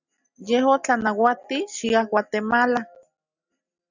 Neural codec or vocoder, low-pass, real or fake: none; 7.2 kHz; real